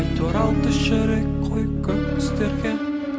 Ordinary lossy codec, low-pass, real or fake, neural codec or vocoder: none; none; real; none